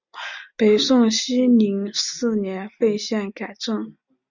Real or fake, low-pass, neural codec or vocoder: real; 7.2 kHz; none